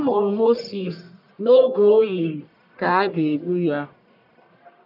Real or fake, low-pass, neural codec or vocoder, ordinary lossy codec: fake; 5.4 kHz; codec, 44.1 kHz, 1.7 kbps, Pupu-Codec; none